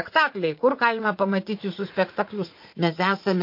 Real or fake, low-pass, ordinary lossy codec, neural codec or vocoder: fake; 5.4 kHz; MP3, 32 kbps; vocoder, 44.1 kHz, 80 mel bands, Vocos